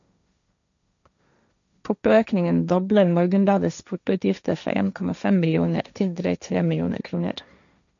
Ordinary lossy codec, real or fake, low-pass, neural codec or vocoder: MP3, 96 kbps; fake; 7.2 kHz; codec, 16 kHz, 1.1 kbps, Voila-Tokenizer